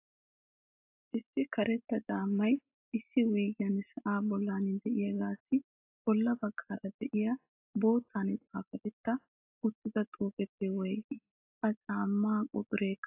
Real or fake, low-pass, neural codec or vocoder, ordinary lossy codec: real; 3.6 kHz; none; AAC, 32 kbps